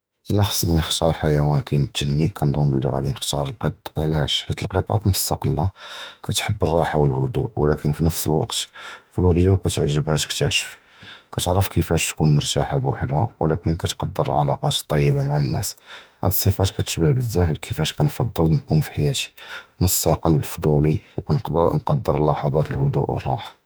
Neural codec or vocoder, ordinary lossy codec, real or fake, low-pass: autoencoder, 48 kHz, 32 numbers a frame, DAC-VAE, trained on Japanese speech; none; fake; none